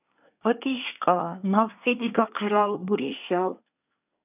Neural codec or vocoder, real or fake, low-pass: codec, 24 kHz, 1 kbps, SNAC; fake; 3.6 kHz